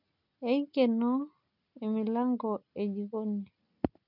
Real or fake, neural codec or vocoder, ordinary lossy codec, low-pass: real; none; none; 5.4 kHz